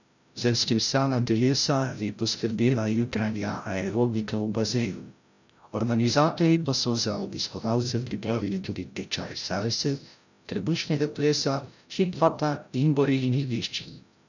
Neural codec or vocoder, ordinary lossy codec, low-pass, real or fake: codec, 16 kHz, 0.5 kbps, FreqCodec, larger model; none; 7.2 kHz; fake